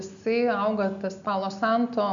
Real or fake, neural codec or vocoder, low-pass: real; none; 7.2 kHz